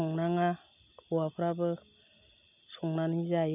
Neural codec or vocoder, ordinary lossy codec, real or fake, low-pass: none; none; real; 3.6 kHz